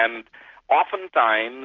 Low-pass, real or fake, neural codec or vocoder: 7.2 kHz; real; none